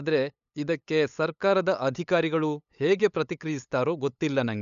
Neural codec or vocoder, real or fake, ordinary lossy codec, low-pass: codec, 16 kHz, 8 kbps, FunCodec, trained on LibriTTS, 25 frames a second; fake; none; 7.2 kHz